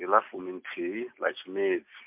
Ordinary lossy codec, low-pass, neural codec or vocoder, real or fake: MP3, 32 kbps; 3.6 kHz; none; real